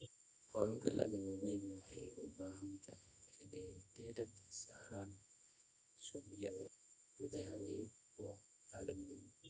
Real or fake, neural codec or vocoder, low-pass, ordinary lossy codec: fake; codec, 24 kHz, 0.9 kbps, WavTokenizer, medium music audio release; 10.8 kHz; none